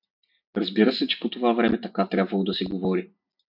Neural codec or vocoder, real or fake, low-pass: none; real; 5.4 kHz